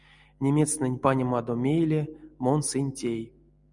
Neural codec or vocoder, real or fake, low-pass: none; real; 10.8 kHz